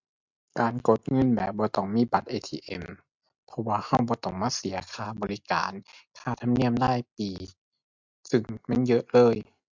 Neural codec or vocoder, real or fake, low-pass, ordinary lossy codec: none; real; 7.2 kHz; MP3, 64 kbps